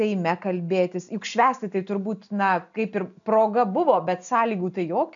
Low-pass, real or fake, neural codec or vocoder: 7.2 kHz; real; none